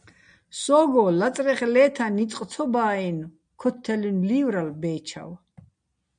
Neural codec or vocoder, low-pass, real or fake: none; 9.9 kHz; real